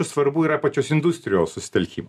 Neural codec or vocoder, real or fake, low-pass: none; real; 14.4 kHz